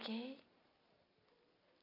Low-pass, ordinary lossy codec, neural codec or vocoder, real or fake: 5.4 kHz; none; none; real